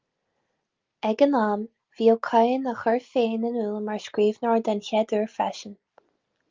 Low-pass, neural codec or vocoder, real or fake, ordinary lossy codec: 7.2 kHz; none; real; Opus, 32 kbps